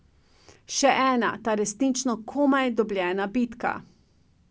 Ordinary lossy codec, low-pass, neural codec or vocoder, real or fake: none; none; none; real